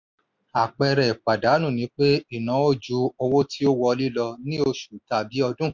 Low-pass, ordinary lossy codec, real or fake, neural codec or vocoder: 7.2 kHz; MP3, 64 kbps; real; none